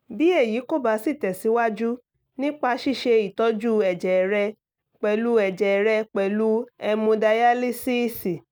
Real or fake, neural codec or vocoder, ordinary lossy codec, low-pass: real; none; none; none